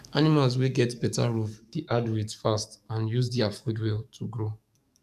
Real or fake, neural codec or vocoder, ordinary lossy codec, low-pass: fake; codec, 44.1 kHz, 7.8 kbps, DAC; none; 14.4 kHz